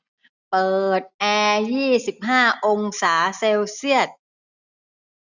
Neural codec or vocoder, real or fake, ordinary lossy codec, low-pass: none; real; none; 7.2 kHz